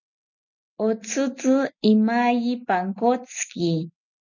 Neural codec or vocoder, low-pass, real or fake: none; 7.2 kHz; real